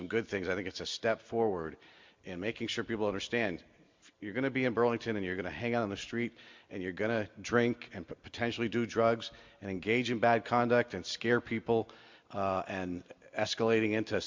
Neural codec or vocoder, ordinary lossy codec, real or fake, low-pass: none; MP3, 64 kbps; real; 7.2 kHz